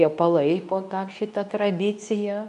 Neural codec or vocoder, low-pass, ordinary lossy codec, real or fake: codec, 24 kHz, 0.9 kbps, WavTokenizer, medium speech release version 2; 10.8 kHz; MP3, 64 kbps; fake